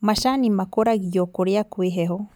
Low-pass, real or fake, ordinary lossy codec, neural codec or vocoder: none; real; none; none